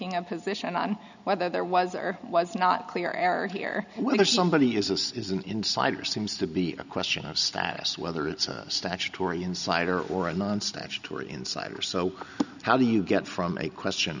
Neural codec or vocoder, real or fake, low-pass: none; real; 7.2 kHz